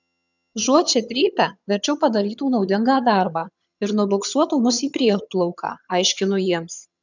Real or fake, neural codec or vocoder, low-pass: fake; vocoder, 22.05 kHz, 80 mel bands, HiFi-GAN; 7.2 kHz